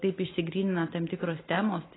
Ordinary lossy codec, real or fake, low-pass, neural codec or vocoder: AAC, 16 kbps; real; 7.2 kHz; none